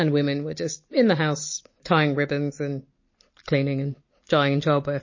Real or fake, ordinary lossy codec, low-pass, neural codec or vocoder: real; MP3, 32 kbps; 7.2 kHz; none